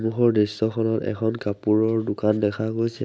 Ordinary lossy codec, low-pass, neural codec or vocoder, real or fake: none; none; none; real